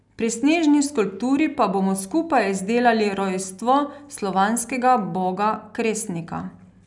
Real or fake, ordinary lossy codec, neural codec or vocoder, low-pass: real; none; none; 10.8 kHz